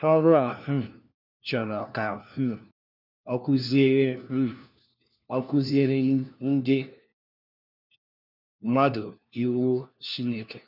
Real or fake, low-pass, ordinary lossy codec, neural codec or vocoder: fake; 5.4 kHz; none; codec, 16 kHz, 1 kbps, FunCodec, trained on LibriTTS, 50 frames a second